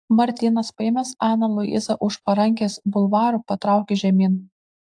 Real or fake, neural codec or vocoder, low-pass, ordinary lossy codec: real; none; 9.9 kHz; AAC, 64 kbps